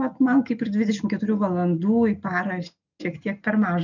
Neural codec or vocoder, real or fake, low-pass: none; real; 7.2 kHz